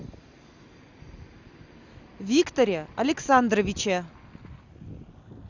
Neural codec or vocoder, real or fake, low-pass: none; real; 7.2 kHz